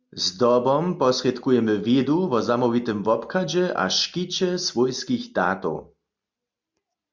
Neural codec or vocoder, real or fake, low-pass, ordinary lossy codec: none; real; 7.2 kHz; MP3, 64 kbps